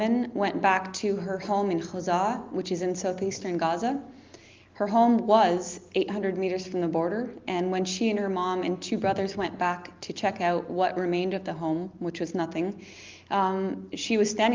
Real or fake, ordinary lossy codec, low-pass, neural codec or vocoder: real; Opus, 24 kbps; 7.2 kHz; none